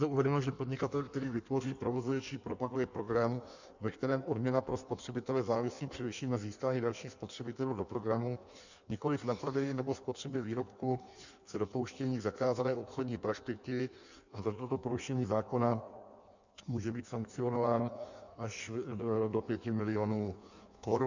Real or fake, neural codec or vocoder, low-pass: fake; codec, 16 kHz in and 24 kHz out, 1.1 kbps, FireRedTTS-2 codec; 7.2 kHz